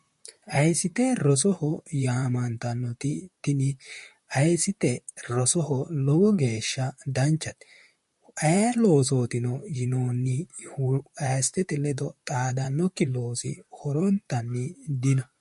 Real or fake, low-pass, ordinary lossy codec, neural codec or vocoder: fake; 14.4 kHz; MP3, 48 kbps; vocoder, 44.1 kHz, 128 mel bands, Pupu-Vocoder